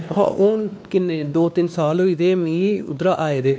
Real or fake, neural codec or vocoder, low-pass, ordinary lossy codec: fake; codec, 16 kHz, 2 kbps, X-Codec, WavLM features, trained on Multilingual LibriSpeech; none; none